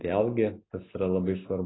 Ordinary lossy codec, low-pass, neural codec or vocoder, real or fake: AAC, 16 kbps; 7.2 kHz; none; real